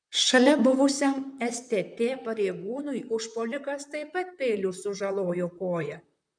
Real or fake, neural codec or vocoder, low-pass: fake; vocoder, 44.1 kHz, 128 mel bands, Pupu-Vocoder; 9.9 kHz